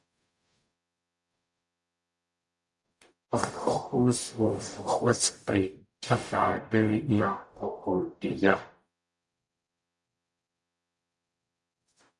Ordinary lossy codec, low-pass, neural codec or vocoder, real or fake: MP3, 96 kbps; 10.8 kHz; codec, 44.1 kHz, 0.9 kbps, DAC; fake